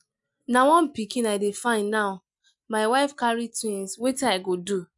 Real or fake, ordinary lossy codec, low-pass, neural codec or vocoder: real; none; 10.8 kHz; none